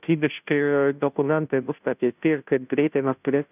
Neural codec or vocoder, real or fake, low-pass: codec, 16 kHz, 0.5 kbps, FunCodec, trained on Chinese and English, 25 frames a second; fake; 3.6 kHz